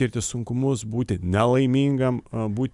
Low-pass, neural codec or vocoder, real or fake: 10.8 kHz; none; real